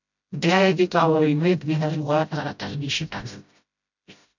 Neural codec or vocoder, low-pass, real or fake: codec, 16 kHz, 0.5 kbps, FreqCodec, smaller model; 7.2 kHz; fake